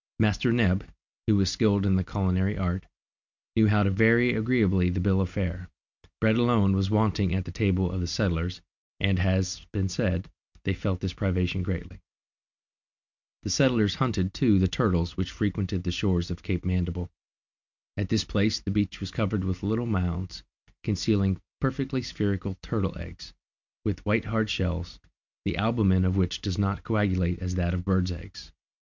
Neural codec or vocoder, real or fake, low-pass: none; real; 7.2 kHz